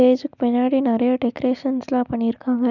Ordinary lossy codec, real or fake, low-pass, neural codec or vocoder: none; real; 7.2 kHz; none